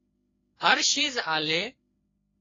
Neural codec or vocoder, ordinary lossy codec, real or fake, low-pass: codec, 16 kHz, 2 kbps, FreqCodec, larger model; AAC, 32 kbps; fake; 7.2 kHz